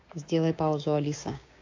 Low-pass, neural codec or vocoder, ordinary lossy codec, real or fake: 7.2 kHz; codec, 16 kHz, 6 kbps, DAC; AAC, 48 kbps; fake